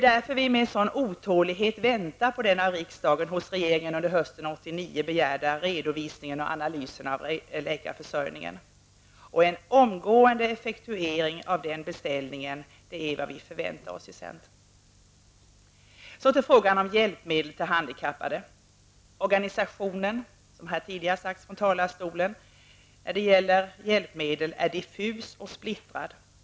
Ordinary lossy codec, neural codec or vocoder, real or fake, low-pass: none; none; real; none